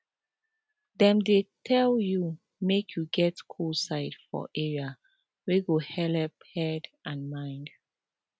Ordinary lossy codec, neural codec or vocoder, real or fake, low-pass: none; none; real; none